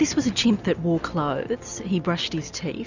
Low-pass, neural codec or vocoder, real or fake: 7.2 kHz; none; real